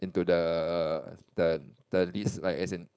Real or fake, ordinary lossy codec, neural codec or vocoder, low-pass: fake; none; codec, 16 kHz, 4.8 kbps, FACodec; none